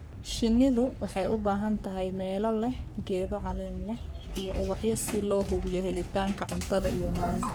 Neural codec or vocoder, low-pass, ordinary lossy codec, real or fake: codec, 44.1 kHz, 3.4 kbps, Pupu-Codec; none; none; fake